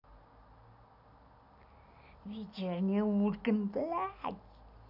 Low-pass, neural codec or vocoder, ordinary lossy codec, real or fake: 5.4 kHz; none; none; real